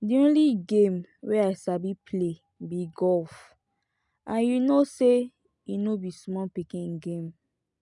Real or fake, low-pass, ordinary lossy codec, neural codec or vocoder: real; 10.8 kHz; none; none